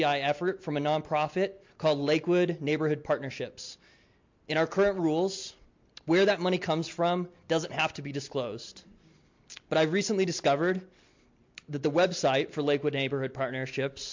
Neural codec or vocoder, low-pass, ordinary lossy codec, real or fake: none; 7.2 kHz; MP3, 48 kbps; real